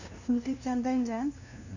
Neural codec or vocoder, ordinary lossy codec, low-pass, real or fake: codec, 16 kHz, 0.5 kbps, FunCodec, trained on LibriTTS, 25 frames a second; none; 7.2 kHz; fake